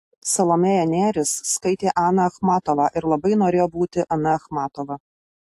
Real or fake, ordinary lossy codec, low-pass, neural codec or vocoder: real; AAC, 64 kbps; 14.4 kHz; none